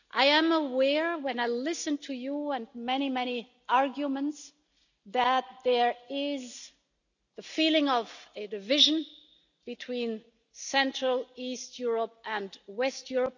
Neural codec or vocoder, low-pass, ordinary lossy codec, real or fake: none; 7.2 kHz; none; real